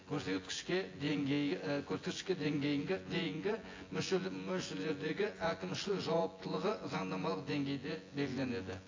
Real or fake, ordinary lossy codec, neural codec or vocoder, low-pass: fake; AAC, 32 kbps; vocoder, 24 kHz, 100 mel bands, Vocos; 7.2 kHz